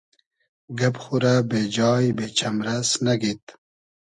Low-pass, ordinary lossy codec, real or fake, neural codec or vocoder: 10.8 kHz; MP3, 96 kbps; real; none